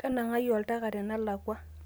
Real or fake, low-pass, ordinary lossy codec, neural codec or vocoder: real; none; none; none